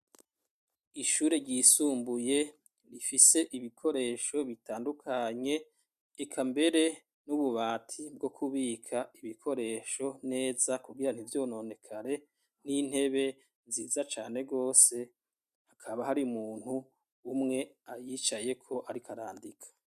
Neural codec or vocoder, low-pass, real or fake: none; 14.4 kHz; real